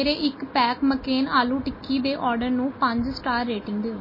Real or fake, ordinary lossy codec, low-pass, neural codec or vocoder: real; MP3, 24 kbps; 5.4 kHz; none